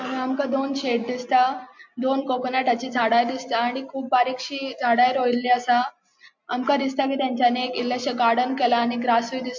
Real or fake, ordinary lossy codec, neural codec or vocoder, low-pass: real; MP3, 48 kbps; none; 7.2 kHz